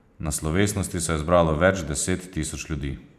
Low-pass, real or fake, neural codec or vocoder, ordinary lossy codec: 14.4 kHz; fake; vocoder, 44.1 kHz, 128 mel bands every 512 samples, BigVGAN v2; none